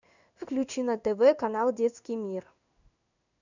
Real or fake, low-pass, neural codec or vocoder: fake; 7.2 kHz; codec, 16 kHz in and 24 kHz out, 1 kbps, XY-Tokenizer